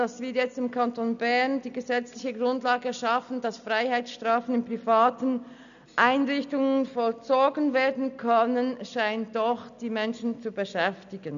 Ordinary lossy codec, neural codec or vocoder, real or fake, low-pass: none; none; real; 7.2 kHz